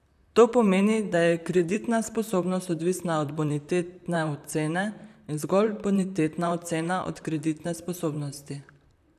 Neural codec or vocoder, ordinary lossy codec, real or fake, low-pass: vocoder, 44.1 kHz, 128 mel bands, Pupu-Vocoder; none; fake; 14.4 kHz